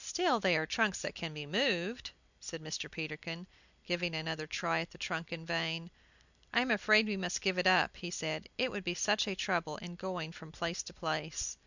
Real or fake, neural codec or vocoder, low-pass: fake; vocoder, 44.1 kHz, 128 mel bands every 256 samples, BigVGAN v2; 7.2 kHz